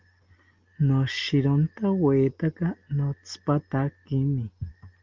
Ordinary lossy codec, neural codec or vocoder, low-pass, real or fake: Opus, 32 kbps; none; 7.2 kHz; real